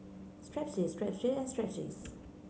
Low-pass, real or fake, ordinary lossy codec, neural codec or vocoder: none; real; none; none